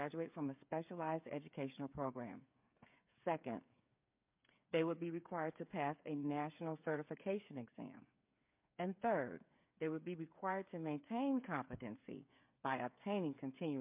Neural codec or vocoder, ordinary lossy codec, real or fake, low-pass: codec, 16 kHz, 8 kbps, FreqCodec, smaller model; MP3, 32 kbps; fake; 3.6 kHz